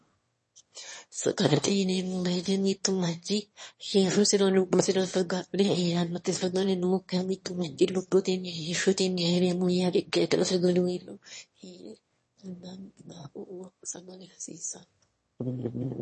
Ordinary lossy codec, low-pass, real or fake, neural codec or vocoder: MP3, 32 kbps; 9.9 kHz; fake; autoencoder, 22.05 kHz, a latent of 192 numbers a frame, VITS, trained on one speaker